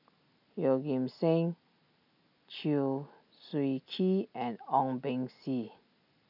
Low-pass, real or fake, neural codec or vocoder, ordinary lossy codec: 5.4 kHz; real; none; none